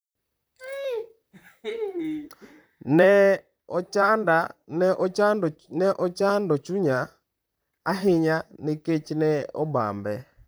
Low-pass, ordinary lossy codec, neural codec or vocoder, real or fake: none; none; vocoder, 44.1 kHz, 128 mel bands, Pupu-Vocoder; fake